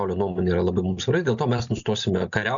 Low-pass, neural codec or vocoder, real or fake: 7.2 kHz; none; real